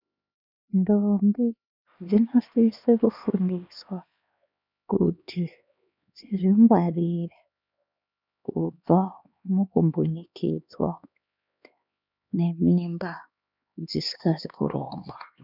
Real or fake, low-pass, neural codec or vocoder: fake; 5.4 kHz; codec, 16 kHz, 2 kbps, X-Codec, HuBERT features, trained on LibriSpeech